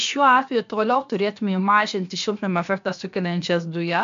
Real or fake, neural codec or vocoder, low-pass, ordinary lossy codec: fake; codec, 16 kHz, about 1 kbps, DyCAST, with the encoder's durations; 7.2 kHz; AAC, 64 kbps